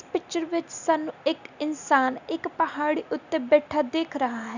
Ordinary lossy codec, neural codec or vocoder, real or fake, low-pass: none; none; real; 7.2 kHz